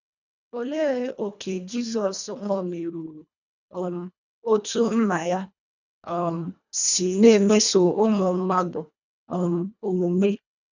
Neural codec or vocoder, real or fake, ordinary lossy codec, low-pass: codec, 24 kHz, 1.5 kbps, HILCodec; fake; none; 7.2 kHz